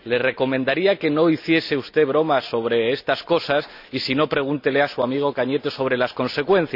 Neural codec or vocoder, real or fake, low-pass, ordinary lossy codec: none; real; 5.4 kHz; none